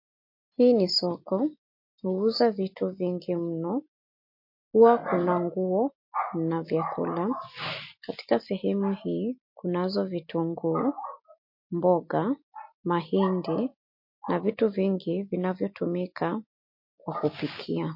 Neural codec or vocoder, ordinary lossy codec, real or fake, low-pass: none; MP3, 32 kbps; real; 5.4 kHz